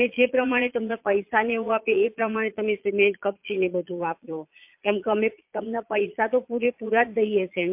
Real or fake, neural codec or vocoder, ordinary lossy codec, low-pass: fake; vocoder, 22.05 kHz, 80 mel bands, Vocos; MP3, 32 kbps; 3.6 kHz